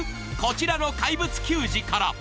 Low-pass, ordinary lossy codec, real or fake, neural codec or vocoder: none; none; real; none